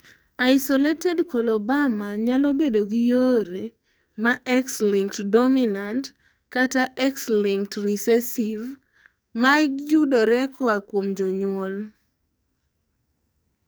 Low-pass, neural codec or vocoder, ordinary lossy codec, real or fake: none; codec, 44.1 kHz, 2.6 kbps, SNAC; none; fake